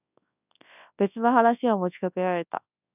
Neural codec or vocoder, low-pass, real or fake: codec, 24 kHz, 0.9 kbps, WavTokenizer, large speech release; 3.6 kHz; fake